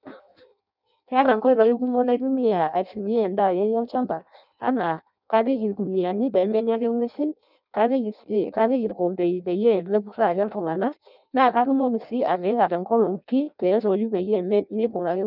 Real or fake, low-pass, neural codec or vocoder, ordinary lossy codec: fake; 5.4 kHz; codec, 16 kHz in and 24 kHz out, 0.6 kbps, FireRedTTS-2 codec; none